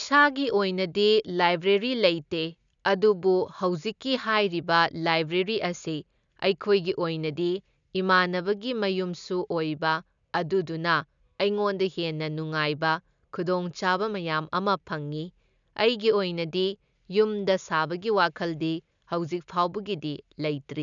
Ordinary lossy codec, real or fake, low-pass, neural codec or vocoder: none; real; 7.2 kHz; none